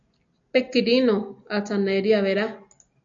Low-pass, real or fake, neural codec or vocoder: 7.2 kHz; real; none